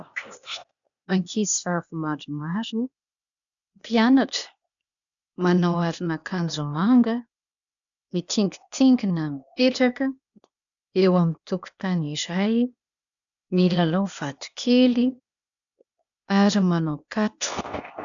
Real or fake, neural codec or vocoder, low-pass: fake; codec, 16 kHz, 0.8 kbps, ZipCodec; 7.2 kHz